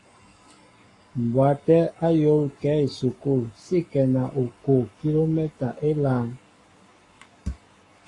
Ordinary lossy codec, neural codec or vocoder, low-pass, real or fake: AAC, 32 kbps; codec, 44.1 kHz, 7.8 kbps, Pupu-Codec; 10.8 kHz; fake